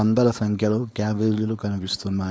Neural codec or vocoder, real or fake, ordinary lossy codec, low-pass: codec, 16 kHz, 4.8 kbps, FACodec; fake; none; none